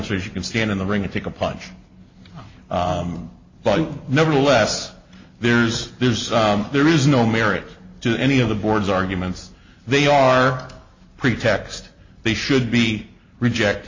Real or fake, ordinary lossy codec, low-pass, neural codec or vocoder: real; MP3, 32 kbps; 7.2 kHz; none